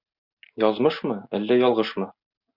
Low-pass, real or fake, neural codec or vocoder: 5.4 kHz; real; none